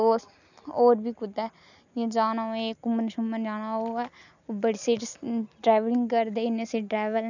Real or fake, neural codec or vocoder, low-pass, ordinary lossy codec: real; none; 7.2 kHz; none